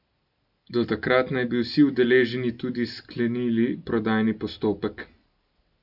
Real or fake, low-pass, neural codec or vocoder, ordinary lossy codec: real; 5.4 kHz; none; none